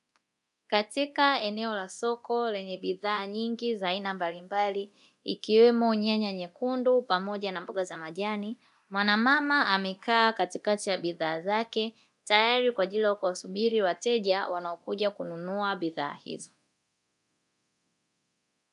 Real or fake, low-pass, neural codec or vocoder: fake; 10.8 kHz; codec, 24 kHz, 0.9 kbps, DualCodec